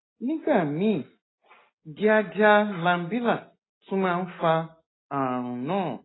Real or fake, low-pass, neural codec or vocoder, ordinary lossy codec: real; 7.2 kHz; none; AAC, 16 kbps